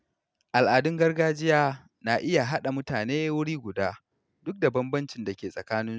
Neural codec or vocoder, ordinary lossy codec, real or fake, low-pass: none; none; real; none